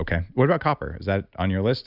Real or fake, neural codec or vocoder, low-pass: real; none; 5.4 kHz